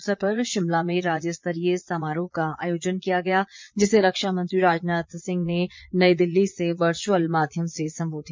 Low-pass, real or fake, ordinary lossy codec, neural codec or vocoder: 7.2 kHz; fake; MP3, 64 kbps; vocoder, 22.05 kHz, 80 mel bands, Vocos